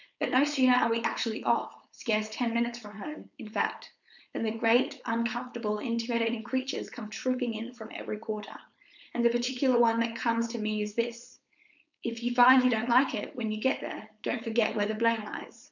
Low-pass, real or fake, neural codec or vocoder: 7.2 kHz; fake; codec, 16 kHz, 4.8 kbps, FACodec